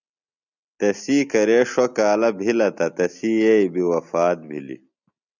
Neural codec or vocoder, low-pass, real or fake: none; 7.2 kHz; real